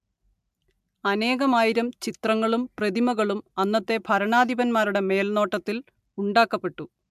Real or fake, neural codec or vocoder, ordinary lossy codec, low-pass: real; none; MP3, 96 kbps; 14.4 kHz